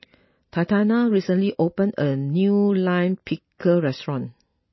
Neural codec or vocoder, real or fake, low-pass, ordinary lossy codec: none; real; 7.2 kHz; MP3, 24 kbps